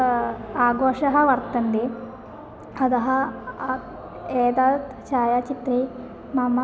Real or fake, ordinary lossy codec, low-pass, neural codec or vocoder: real; none; none; none